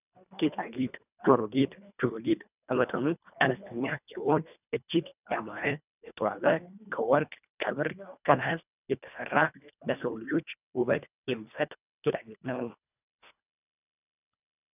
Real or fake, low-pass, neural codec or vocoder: fake; 3.6 kHz; codec, 24 kHz, 1.5 kbps, HILCodec